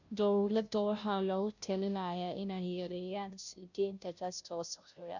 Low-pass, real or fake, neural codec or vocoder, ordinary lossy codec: 7.2 kHz; fake; codec, 16 kHz, 0.5 kbps, FunCodec, trained on Chinese and English, 25 frames a second; none